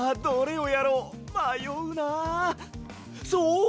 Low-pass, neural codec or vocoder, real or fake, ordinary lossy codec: none; none; real; none